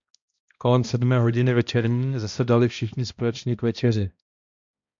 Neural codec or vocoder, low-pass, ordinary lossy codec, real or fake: codec, 16 kHz, 1 kbps, X-Codec, HuBERT features, trained on balanced general audio; 7.2 kHz; MP3, 64 kbps; fake